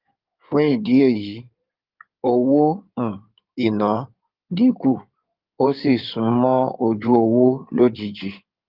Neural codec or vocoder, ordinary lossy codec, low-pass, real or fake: codec, 16 kHz in and 24 kHz out, 2.2 kbps, FireRedTTS-2 codec; Opus, 32 kbps; 5.4 kHz; fake